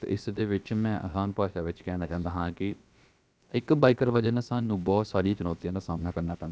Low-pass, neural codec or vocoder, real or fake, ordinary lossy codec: none; codec, 16 kHz, about 1 kbps, DyCAST, with the encoder's durations; fake; none